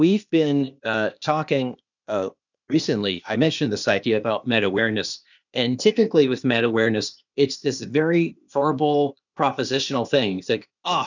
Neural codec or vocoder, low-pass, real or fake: codec, 16 kHz, 0.8 kbps, ZipCodec; 7.2 kHz; fake